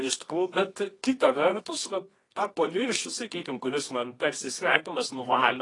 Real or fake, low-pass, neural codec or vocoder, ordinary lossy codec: fake; 10.8 kHz; codec, 24 kHz, 0.9 kbps, WavTokenizer, medium music audio release; AAC, 32 kbps